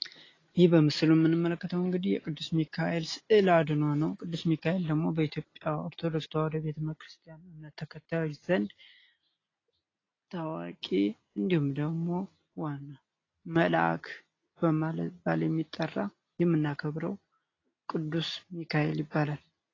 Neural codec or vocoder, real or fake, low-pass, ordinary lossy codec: none; real; 7.2 kHz; AAC, 32 kbps